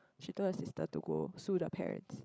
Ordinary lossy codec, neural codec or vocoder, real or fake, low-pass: none; codec, 16 kHz, 16 kbps, FreqCodec, larger model; fake; none